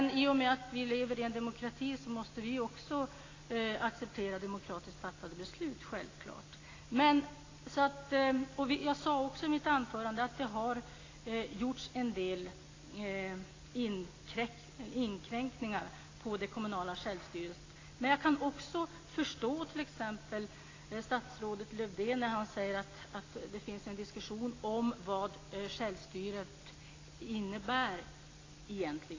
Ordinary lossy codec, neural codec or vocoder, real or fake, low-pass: AAC, 32 kbps; none; real; 7.2 kHz